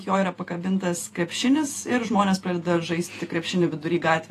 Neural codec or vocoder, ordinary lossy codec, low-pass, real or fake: vocoder, 48 kHz, 128 mel bands, Vocos; AAC, 48 kbps; 14.4 kHz; fake